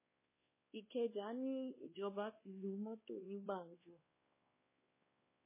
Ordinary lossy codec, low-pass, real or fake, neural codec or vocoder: MP3, 16 kbps; 3.6 kHz; fake; codec, 16 kHz, 2 kbps, X-Codec, WavLM features, trained on Multilingual LibriSpeech